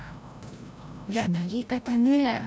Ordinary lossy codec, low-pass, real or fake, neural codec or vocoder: none; none; fake; codec, 16 kHz, 0.5 kbps, FreqCodec, larger model